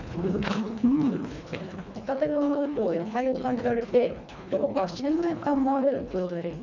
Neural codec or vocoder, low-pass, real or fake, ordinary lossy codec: codec, 24 kHz, 1.5 kbps, HILCodec; 7.2 kHz; fake; none